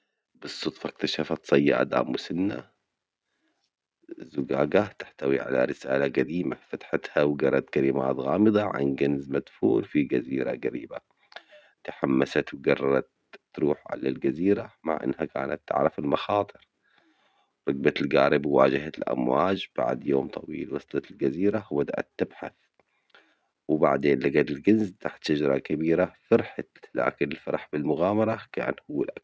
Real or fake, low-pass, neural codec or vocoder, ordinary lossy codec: real; none; none; none